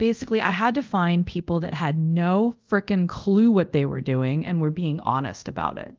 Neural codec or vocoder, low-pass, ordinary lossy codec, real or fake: codec, 24 kHz, 0.5 kbps, DualCodec; 7.2 kHz; Opus, 32 kbps; fake